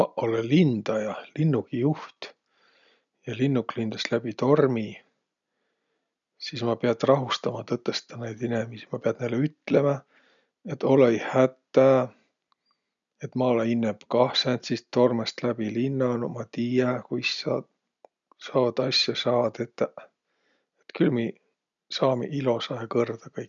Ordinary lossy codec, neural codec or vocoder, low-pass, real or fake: none; none; 7.2 kHz; real